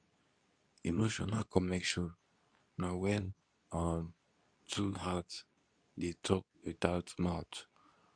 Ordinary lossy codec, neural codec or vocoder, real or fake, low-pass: none; codec, 24 kHz, 0.9 kbps, WavTokenizer, medium speech release version 2; fake; 9.9 kHz